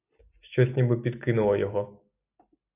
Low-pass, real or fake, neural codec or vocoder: 3.6 kHz; real; none